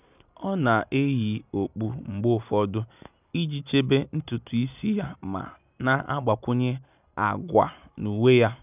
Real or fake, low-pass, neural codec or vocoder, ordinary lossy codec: real; 3.6 kHz; none; none